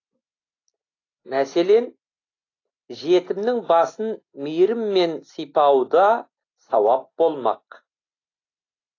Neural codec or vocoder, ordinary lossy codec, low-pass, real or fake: none; AAC, 32 kbps; 7.2 kHz; real